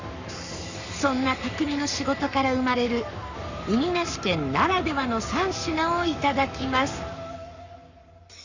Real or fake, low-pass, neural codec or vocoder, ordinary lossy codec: fake; 7.2 kHz; codec, 44.1 kHz, 7.8 kbps, Pupu-Codec; Opus, 64 kbps